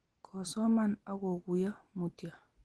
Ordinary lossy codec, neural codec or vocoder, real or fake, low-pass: Opus, 16 kbps; none; real; 10.8 kHz